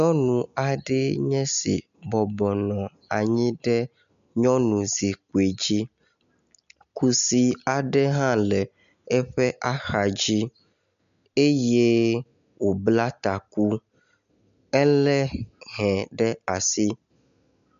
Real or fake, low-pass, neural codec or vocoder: real; 7.2 kHz; none